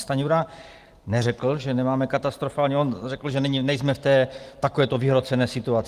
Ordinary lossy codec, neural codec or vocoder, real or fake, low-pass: Opus, 24 kbps; none; real; 14.4 kHz